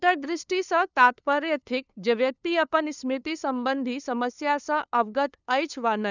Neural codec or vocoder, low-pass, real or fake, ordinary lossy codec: codec, 16 kHz, 2 kbps, FunCodec, trained on LibriTTS, 25 frames a second; 7.2 kHz; fake; none